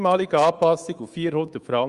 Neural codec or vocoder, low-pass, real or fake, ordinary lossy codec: codec, 24 kHz, 3.1 kbps, DualCodec; 10.8 kHz; fake; Opus, 32 kbps